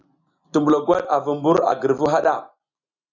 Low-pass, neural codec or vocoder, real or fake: 7.2 kHz; none; real